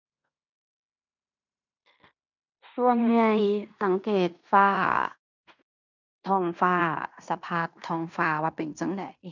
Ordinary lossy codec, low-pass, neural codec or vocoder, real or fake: none; 7.2 kHz; codec, 16 kHz in and 24 kHz out, 0.9 kbps, LongCat-Audio-Codec, fine tuned four codebook decoder; fake